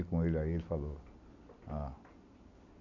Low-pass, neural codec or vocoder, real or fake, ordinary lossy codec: 7.2 kHz; none; real; none